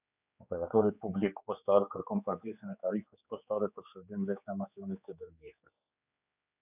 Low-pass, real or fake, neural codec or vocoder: 3.6 kHz; fake; codec, 16 kHz, 4 kbps, X-Codec, HuBERT features, trained on general audio